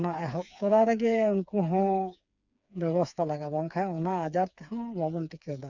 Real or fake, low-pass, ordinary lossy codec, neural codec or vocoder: fake; 7.2 kHz; none; codec, 16 kHz, 4 kbps, FreqCodec, smaller model